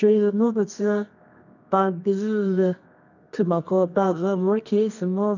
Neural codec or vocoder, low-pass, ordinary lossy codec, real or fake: codec, 24 kHz, 0.9 kbps, WavTokenizer, medium music audio release; 7.2 kHz; none; fake